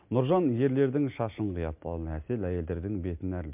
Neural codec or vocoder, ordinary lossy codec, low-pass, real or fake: none; MP3, 32 kbps; 3.6 kHz; real